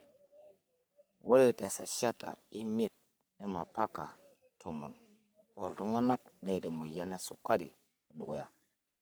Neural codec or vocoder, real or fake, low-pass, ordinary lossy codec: codec, 44.1 kHz, 3.4 kbps, Pupu-Codec; fake; none; none